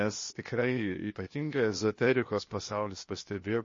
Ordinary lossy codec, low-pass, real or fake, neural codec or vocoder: MP3, 32 kbps; 7.2 kHz; fake; codec, 16 kHz, 0.8 kbps, ZipCodec